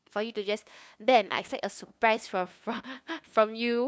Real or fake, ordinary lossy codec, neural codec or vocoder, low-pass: fake; none; codec, 16 kHz, 2 kbps, FunCodec, trained on LibriTTS, 25 frames a second; none